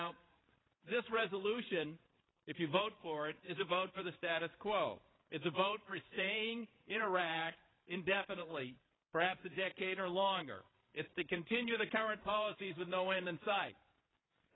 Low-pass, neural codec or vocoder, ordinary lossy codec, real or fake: 7.2 kHz; codec, 16 kHz, 8 kbps, FreqCodec, larger model; AAC, 16 kbps; fake